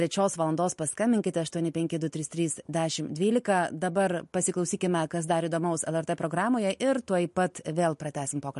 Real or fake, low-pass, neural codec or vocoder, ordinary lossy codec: real; 14.4 kHz; none; MP3, 48 kbps